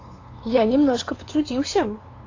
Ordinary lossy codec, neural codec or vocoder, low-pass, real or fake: AAC, 32 kbps; codec, 16 kHz, 2 kbps, FunCodec, trained on LibriTTS, 25 frames a second; 7.2 kHz; fake